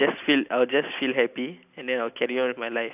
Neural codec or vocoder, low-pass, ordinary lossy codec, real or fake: none; 3.6 kHz; AAC, 32 kbps; real